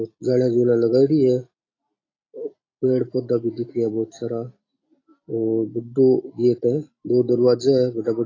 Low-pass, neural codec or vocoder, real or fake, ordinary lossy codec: 7.2 kHz; none; real; AAC, 32 kbps